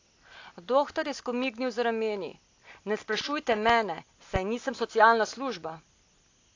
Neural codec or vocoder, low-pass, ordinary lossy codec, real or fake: none; 7.2 kHz; AAC, 48 kbps; real